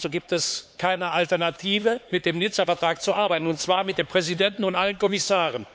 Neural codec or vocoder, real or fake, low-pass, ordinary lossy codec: codec, 16 kHz, 4 kbps, X-Codec, HuBERT features, trained on LibriSpeech; fake; none; none